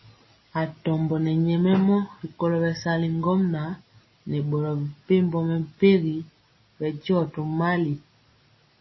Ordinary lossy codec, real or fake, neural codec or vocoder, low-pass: MP3, 24 kbps; real; none; 7.2 kHz